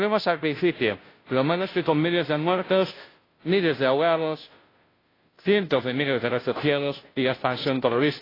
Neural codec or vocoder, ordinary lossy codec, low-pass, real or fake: codec, 16 kHz, 0.5 kbps, FunCodec, trained on Chinese and English, 25 frames a second; AAC, 24 kbps; 5.4 kHz; fake